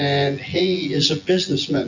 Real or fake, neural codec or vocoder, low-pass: fake; vocoder, 24 kHz, 100 mel bands, Vocos; 7.2 kHz